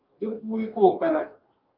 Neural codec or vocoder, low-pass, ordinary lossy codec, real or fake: codec, 44.1 kHz, 2.6 kbps, DAC; 5.4 kHz; Opus, 24 kbps; fake